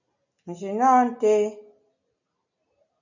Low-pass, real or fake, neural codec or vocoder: 7.2 kHz; real; none